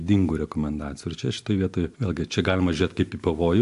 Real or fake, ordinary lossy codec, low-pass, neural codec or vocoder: real; AAC, 64 kbps; 10.8 kHz; none